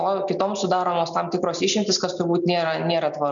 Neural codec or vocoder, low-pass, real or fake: none; 7.2 kHz; real